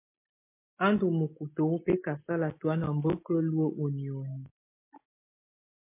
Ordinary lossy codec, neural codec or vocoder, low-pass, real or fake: MP3, 24 kbps; none; 3.6 kHz; real